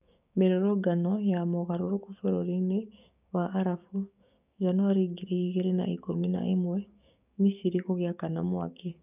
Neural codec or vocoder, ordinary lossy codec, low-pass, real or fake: codec, 16 kHz, 6 kbps, DAC; none; 3.6 kHz; fake